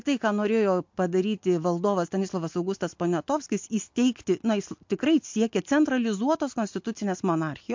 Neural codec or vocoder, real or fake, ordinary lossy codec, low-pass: none; real; MP3, 48 kbps; 7.2 kHz